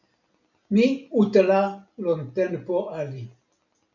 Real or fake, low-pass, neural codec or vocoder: real; 7.2 kHz; none